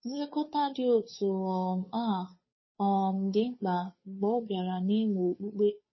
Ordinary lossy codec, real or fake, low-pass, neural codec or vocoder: MP3, 24 kbps; fake; 7.2 kHz; codec, 16 kHz, 2 kbps, FunCodec, trained on Chinese and English, 25 frames a second